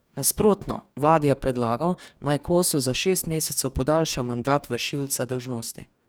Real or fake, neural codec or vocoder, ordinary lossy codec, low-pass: fake; codec, 44.1 kHz, 2.6 kbps, DAC; none; none